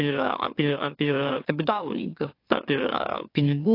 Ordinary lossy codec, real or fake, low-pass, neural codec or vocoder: AAC, 32 kbps; fake; 5.4 kHz; autoencoder, 44.1 kHz, a latent of 192 numbers a frame, MeloTTS